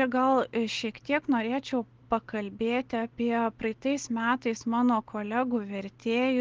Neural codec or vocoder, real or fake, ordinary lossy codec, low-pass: none; real; Opus, 16 kbps; 7.2 kHz